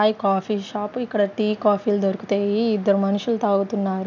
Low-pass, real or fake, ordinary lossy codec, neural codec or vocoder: 7.2 kHz; real; none; none